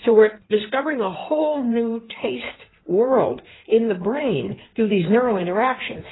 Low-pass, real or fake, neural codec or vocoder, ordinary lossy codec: 7.2 kHz; fake; codec, 16 kHz in and 24 kHz out, 1.1 kbps, FireRedTTS-2 codec; AAC, 16 kbps